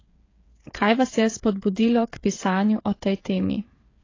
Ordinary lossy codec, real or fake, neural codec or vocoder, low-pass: AAC, 32 kbps; fake; codec, 16 kHz, 8 kbps, FreqCodec, smaller model; 7.2 kHz